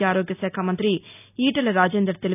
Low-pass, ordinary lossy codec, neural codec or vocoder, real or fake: 3.6 kHz; none; none; real